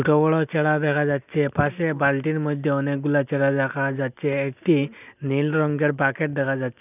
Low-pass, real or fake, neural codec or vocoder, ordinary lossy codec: 3.6 kHz; real; none; none